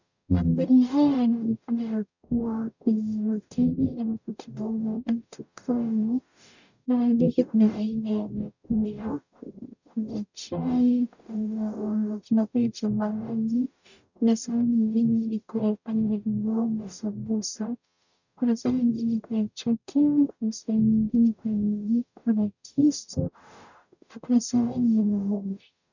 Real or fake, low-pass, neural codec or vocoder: fake; 7.2 kHz; codec, 44.1 kHz, 0.9 kbps, DAC